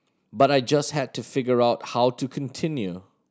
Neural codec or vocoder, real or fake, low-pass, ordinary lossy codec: none; real; none; none